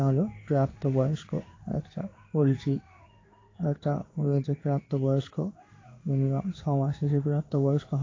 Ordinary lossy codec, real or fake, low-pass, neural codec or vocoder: AAC, 32 kbps; fake; 7.2 kHz; codec, 16 kHz in and 24 kHz out, 1 kbps, XY-Tokenizer